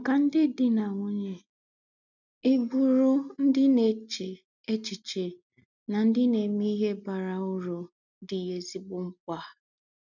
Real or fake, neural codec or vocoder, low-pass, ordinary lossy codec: real; none; 7.2 kHz; none